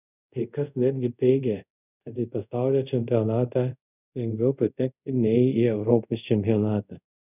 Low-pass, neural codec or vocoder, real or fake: 3.6 kHz; codec, 24 kHz, 0.5 kbps, DualCodec; fake